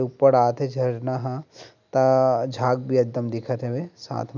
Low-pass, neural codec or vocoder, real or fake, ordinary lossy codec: 7.2 kHz; none; real; none